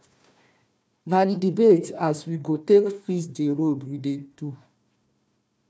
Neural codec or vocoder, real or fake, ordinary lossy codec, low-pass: codec, 16 kHz, 1 kbps, FunCodec, trained on Chinese and English, 50 frames a second; fake; none; none